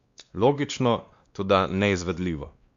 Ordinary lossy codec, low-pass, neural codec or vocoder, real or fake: Opus, 64 kbps; 7.2 kHz; codec, 16 kHz, 2 kbps, X-Codec, WavLM features, trained on Multilingual LibriSpeech; fake